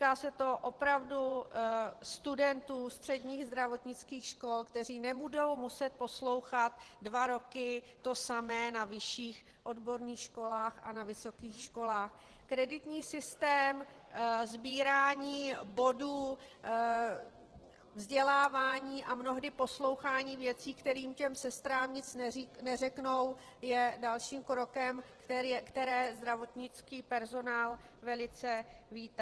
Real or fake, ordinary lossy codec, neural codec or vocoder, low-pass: fake; Opus, 16 kbps; vocoder, 24 kHz, 100 mel bands, Vocos; 10.8 kHz